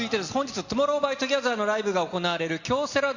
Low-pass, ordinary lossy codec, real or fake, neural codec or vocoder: 7.2 kHz; Opus, 64 kbps; real; none